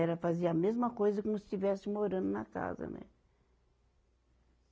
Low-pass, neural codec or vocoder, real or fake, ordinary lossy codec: none; none; real; none